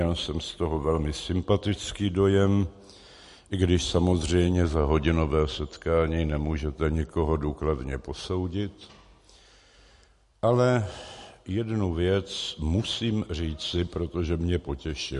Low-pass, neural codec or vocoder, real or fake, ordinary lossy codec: 14.4 kHz; none; real; MP3, 48 kbps